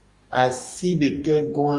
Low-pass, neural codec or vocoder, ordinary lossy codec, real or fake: 10.8 kHz; codec, 44.1 kHz, 2.6 kbps, DAC; Opus, 64 kbps; fake